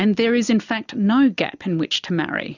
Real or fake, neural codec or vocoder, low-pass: real; none; 7.2 kHz